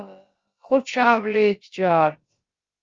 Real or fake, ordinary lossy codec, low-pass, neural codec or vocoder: fake; Opus, 32 kbps; 7.2 kHz; codec, 16 kHz, about 1 kbps, DyCAST, with the encoder's durations